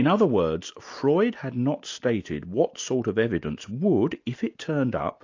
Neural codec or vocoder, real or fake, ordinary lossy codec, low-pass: none; real; AAC, 48 kbps; 7.2 kHz